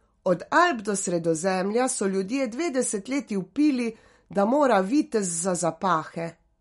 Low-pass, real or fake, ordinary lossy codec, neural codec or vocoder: 19.8 kHz; real; MP3, 48 kbps; none